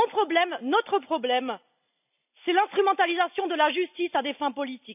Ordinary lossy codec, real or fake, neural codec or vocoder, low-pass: none; real; none; 3.6 kHz